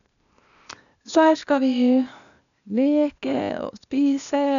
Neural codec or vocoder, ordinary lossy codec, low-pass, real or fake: codec, 16 kHz, 0.8 kbps, ZipCodec; none; 7.2 kHz; fake